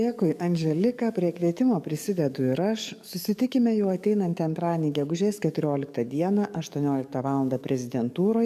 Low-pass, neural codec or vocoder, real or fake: 14.4 kHz; codec, 44.1 kHz, 7.8 kbps, DAC; fake